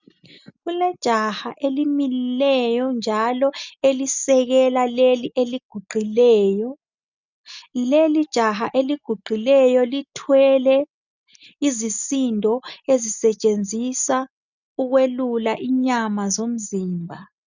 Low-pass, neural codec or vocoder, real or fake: 7.2 kHz; none; real